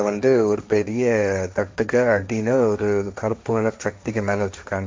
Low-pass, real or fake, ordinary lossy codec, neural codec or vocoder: none; fake; none; codec, 16 kHz, 1.1 kbps, Voila-Tokenizer